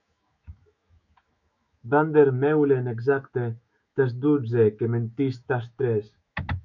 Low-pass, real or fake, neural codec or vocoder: 7.2 kHz; fake; autoencoder, 48 kHz, 128 numbers a frame, DAC-VAE, trained on Japanese speech